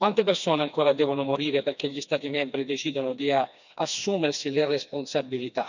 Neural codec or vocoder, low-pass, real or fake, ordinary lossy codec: codec, 16 kHz, 2 kbps, FreqCodec, smaller model; 7.2 kHz; fake; none